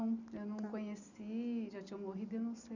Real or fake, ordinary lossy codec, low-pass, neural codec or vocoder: real; none; 7.2 kHz; none